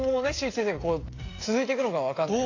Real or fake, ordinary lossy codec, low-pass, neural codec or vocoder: fake; AAC, 32 kbps; 7.2 kHz; codec, 16 kHz, 16 kbps, FreqCodec, smaller model